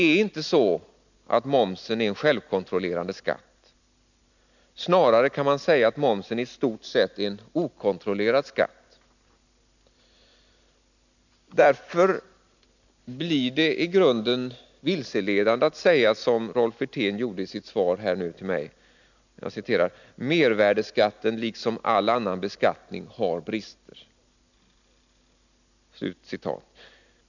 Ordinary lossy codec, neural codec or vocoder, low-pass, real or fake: none; none; 7.2 kHz; real